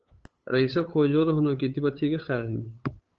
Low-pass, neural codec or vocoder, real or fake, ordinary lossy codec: 7.2 kHz; codec, 16 kHz, 8 kbps, FunCodec, trained on LibriTTS, 25 frames a second; fake; Opus, 24 kbps